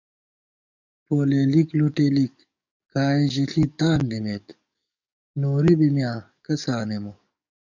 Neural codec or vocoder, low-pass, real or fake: codec, 44.1 kHz, 7.8 kbps, DAC; 7.2 kHz; fake